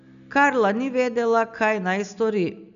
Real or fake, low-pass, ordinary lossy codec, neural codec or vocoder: real; 7.2 kHz; none; none